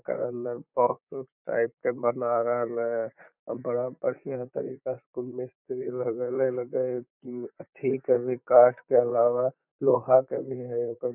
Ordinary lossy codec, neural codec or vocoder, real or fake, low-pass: none; vocoder, 44.1 kHz, 128 mel bands, Pupu-Vocoder; fake; 3.6 kHz